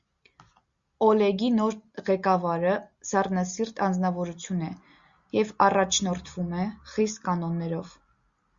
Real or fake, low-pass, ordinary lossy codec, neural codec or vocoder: real; 7.2 kHz; Opus, 64 kbps; none